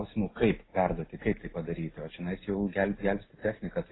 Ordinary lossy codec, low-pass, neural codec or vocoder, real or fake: AAC, 16 kbps; 7.2 kHz; none; real